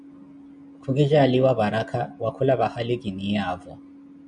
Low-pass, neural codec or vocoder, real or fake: 9.9 kHz; none; real